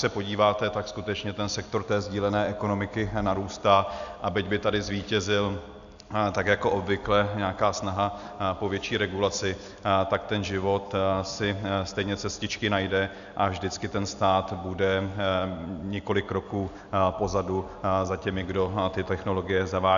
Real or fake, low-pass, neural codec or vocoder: real; 7.2 kHz; none